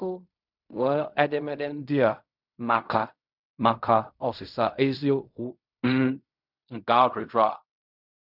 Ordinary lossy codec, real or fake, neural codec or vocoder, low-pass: none; fake; codec, 16 kHz in and 24 kHz out, 0.4 kbps, LongCat-Audio-Codec, fine tuned four codebook decoder; 5.4 kHz